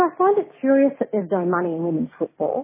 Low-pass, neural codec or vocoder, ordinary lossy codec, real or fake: 3.6 kHz; none; MP3, 16 kbps; real